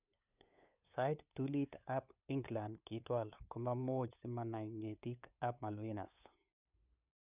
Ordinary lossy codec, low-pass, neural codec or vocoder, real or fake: none; 3.6 kHz; codec, 16 kHz, 8 kbps, FunCodec, trained on LibriTTS, 25 frames a second; fake